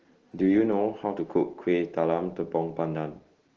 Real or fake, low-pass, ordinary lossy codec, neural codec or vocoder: real; 7.2 kHz; Opus, 16 kbps; none